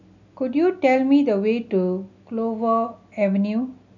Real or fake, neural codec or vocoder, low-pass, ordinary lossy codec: real; none; 7.2 kHz; none